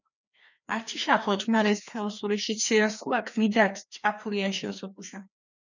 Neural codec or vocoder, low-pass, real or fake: codec, 16 kHz, 2 kbps, FreqCodec, larger model; 7.2 kHz; fake